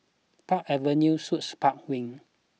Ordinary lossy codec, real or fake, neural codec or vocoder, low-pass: none; real; none; none